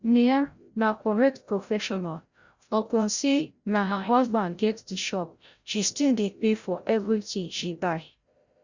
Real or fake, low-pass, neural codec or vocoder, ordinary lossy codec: fake; 7.2 kHz; codec, 16 kHz, 0.5 kbps, FreqCodec, larger model; Opus, 64 kbps